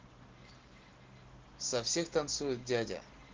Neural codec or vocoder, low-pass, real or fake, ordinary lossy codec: none; 7.2 kHz; real; Opus, 16 kbps